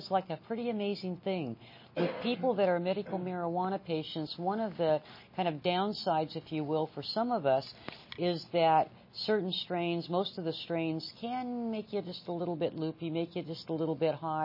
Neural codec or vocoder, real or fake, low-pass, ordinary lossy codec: none; real; 5.4 kHz; MP3, 24 kbps